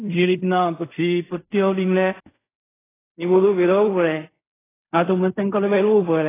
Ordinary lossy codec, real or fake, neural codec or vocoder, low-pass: AAC, 16 kbps; fake; codec, 16 kHz in and 24 kHz out, 0.4 kbps, LongCat-Audio-Codec, fine tuned four codebook decoder; 3.6 kHz